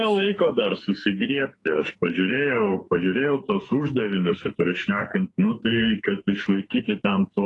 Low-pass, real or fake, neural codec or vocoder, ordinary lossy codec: 10.8 kHz; fake; codec, 44.1 kHz, 2.6 kbps, SNAC; AAC, 32 kbps